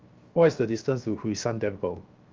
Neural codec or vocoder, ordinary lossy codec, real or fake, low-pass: codec, 16 kHz, 0.7 kbps, FocalCodec; Opus, 32 kbps; fake; 7.2 kHz